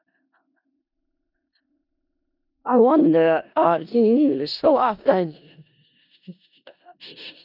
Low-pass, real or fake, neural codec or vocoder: 5.4 kHz; fake; codec, 16 kHz in and 24 kHz out, 0.4 kbps, LongCat-Audio-Codec, four codebook decoder